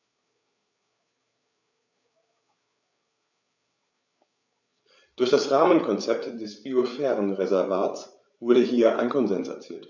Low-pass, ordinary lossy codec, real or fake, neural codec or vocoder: 7.2 kHz; none; fake; codec, 16 kHz, 8 kbps, FreqCodec, larger model